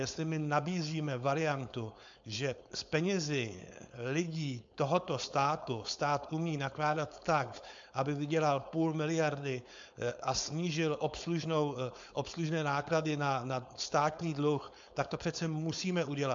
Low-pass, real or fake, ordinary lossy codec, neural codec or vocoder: 7.2 kHz; fake; AAC, 64 kbps; codec, 16 kHz, 4.8 kbps, FACodec